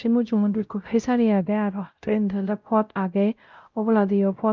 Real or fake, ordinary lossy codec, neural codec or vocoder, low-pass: fake; Opus, 24 kbps; codec, 16 kHz, 0.5 kbps, X-Codec, WavLM features, trained on Multilingual LibriSpeech; 7.2 kHz